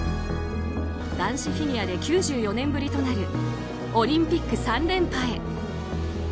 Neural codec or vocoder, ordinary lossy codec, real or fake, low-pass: none; none; real; none